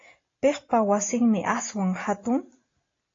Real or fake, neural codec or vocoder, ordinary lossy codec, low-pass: real; none; AAC, 32 kbps; 7.2 kHz